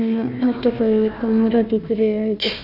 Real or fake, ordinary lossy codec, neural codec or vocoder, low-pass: fake; none; codec, 16 kHz, 1 kbps, FunCodec, trained on Chinese and English, 50 frames a second; 5.4 kHz